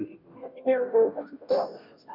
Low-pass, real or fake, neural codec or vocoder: 5.4 kHz; fake; codec, 16 kHz, 0.5 kbps, FunCodec, trained on Chinese and English, 25 frames a second